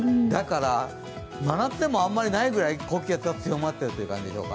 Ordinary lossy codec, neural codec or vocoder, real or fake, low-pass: none; none; real; none